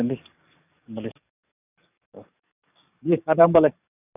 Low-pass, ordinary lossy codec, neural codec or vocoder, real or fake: 3.6 kHz; none; codec, 44.1 kHz, 7.8 kbps, DAC; fake